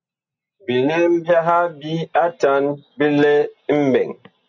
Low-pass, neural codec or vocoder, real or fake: 7.2 kHz; none; real